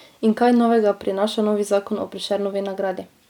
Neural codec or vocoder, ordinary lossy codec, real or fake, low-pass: none; none; real; 19.8 kHz